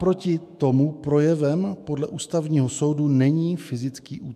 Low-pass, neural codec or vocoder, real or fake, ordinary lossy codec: 14.4 kHz; autoencoder, 48 kHz, 128 numbers a frame, DAC-VAE, trained on Japanese speech; fake; MP3, 96 kbps